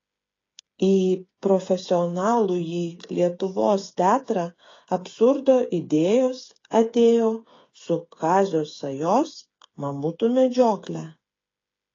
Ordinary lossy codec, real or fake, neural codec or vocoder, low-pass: AAC, 32 kbps; fake; codec, 16 kHz, 8 kbps, FreqCodec, smaller model; 7.2 kHz